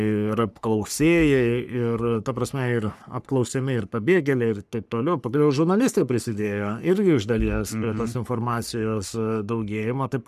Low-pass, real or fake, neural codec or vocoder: 14.4 kHz; fake; codec, 44.1 kHz, 3.4 kbps, Pupu-Codec